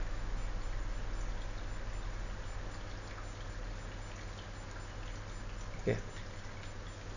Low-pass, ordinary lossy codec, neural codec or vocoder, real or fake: 7.2 kHz; none; none; real